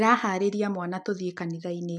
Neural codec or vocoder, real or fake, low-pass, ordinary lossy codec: none; real; none; none